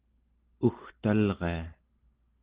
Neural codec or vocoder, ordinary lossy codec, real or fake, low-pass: none; Opus, 16 kbps; real; 3.6 kHz